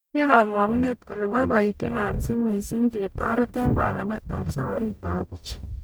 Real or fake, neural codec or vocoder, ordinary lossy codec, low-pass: fake; codec, 44.1 kHz, 0.9 kbps, DAC; none; none